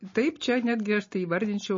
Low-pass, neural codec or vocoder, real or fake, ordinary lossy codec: 7.2 kHz; none; real; MP3, 32 kbps